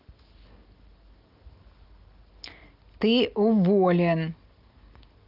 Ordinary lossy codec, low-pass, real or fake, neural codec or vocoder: Opus, 32 kbps; 5.4 kHz; real; none